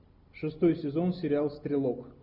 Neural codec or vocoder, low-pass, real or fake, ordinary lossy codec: none; 5.4 kHz; real; Opus, 64 kbps